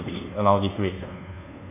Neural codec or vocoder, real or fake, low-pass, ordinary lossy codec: codec, 24 kHz, 1.2 kbps, DualCodec; fake; 3.6 kHz; none